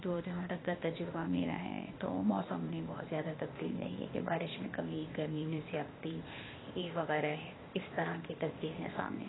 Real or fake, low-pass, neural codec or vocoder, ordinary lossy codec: fake; 7.2 kHz; codec, 16 kHz, 0.8 kbps, ZipCodec; AAC, 16 kbps